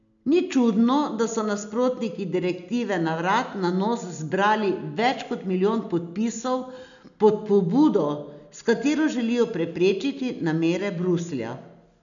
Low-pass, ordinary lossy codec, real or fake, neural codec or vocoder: 7.2 kHz; none; real; none